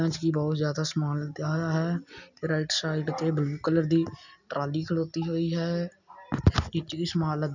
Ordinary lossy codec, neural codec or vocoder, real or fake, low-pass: none; none; real; 7.2 kHz